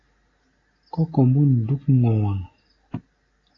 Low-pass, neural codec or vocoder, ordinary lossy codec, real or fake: 7.2 kHz; none; AAC, 32 kbps; real